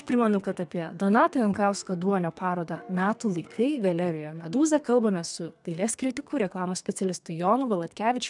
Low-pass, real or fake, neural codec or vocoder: 10.8 kHz; fake; codec, 32 kHz, 1.9 kbps, SNAC